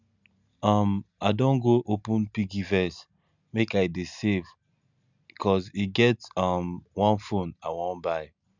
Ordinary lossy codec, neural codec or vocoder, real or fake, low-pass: none; none; real; 7.2 kHz